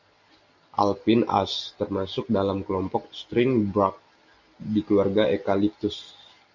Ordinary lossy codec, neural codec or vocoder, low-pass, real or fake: Opus, 64 kbps; none; 7.2 kHz; real